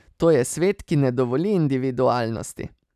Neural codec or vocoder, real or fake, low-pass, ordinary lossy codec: none; real; 14.4 kHz; none